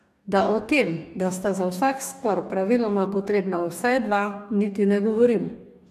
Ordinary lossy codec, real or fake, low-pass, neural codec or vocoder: none; fake; 14.4 kHz; codec, 44.1 kHz, 2.6 kbps, DAC